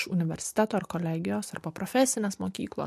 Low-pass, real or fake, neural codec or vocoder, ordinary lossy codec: 14.4 kHz; real; none; MP3, 64 kbps